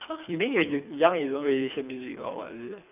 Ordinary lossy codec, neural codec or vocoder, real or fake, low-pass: none; codec, 24 kHz, 3 kbps, HILCodec; fake; 3.6 kHz